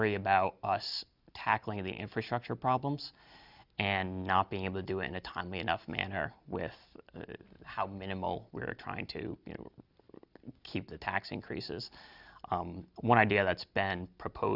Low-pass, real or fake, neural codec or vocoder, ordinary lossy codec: 5.4 kHz; real; none; Opus, 64 kbps